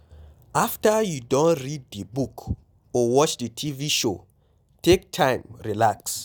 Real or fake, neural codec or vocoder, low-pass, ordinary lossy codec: real; none; none; none